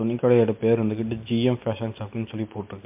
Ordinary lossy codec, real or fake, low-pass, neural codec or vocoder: MP3, 32 kbps; real; 3.6 kHz; none